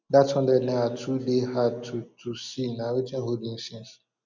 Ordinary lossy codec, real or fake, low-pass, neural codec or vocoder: none; real; 7.2 kHz; none